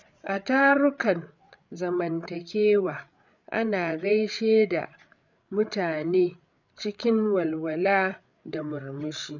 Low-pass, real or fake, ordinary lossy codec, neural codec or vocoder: 7.2 kHz; fake; none; codec, 16 kHz, 8 kbps, FreqCodec, larger model